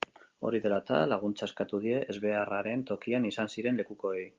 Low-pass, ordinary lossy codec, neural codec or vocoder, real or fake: 7.2 kHz; Opus, 32 kbps; none; real